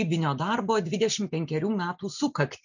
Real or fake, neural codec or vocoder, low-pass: real; none; 7.2 kHz